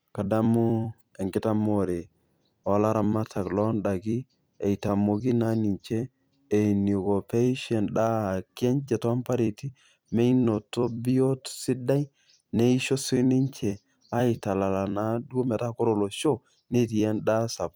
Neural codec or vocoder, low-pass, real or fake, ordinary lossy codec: vocoder, 44.1 kHz, 128 mel bands every 256 samples, BigVGAN v2; none; fake; none